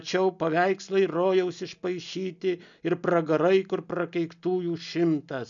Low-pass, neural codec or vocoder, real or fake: 7.2 kHz; none; real